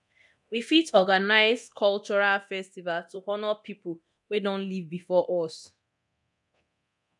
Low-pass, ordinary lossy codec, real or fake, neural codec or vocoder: 10.8 kHz; none; fake; codec, 24 kHz, 0.9 kbps, DualCodec